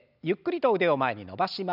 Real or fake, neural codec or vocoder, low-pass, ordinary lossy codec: real; none; 5.4 kHz; none